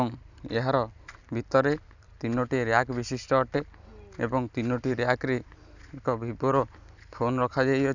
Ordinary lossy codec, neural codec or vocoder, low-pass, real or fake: none; none; 7.2 kHz; real